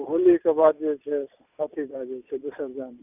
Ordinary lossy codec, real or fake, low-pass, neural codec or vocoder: none; real; 3.6 kHz; none